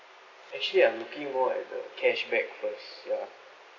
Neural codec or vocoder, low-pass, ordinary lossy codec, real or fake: none; 7.2 kHz; AAC, 32 kbps; real